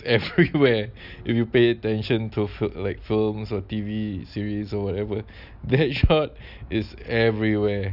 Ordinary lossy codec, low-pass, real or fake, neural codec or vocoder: none; 5.4 kHz; real; none